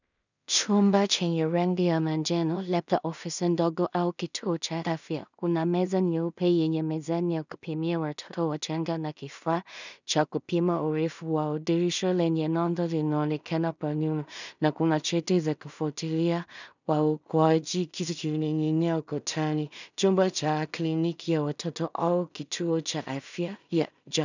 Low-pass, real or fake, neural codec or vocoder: 7.2 kHz; fake; codec, 16 kHz in and 24 kHz out, 0.4 kbps, LongCat-Audio-Codec, two codebook decoder